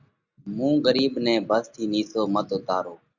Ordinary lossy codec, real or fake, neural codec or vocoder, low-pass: Opus, 64 kbps; real; none; 7.2 kHz